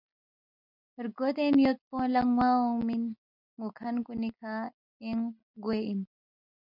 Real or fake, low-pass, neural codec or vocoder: real; 5.4 kHz; none